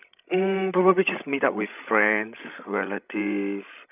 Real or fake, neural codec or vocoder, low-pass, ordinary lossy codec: fake; codec, 16 kHz, 16 kbps, FreqCodec, larger model; 3.6 kHz; none